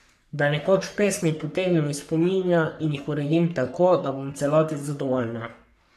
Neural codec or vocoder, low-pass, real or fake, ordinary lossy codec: codec, 44.1 kHz, 3.4 kbps, Pupu-Codec; 14.4 kHz; fake; none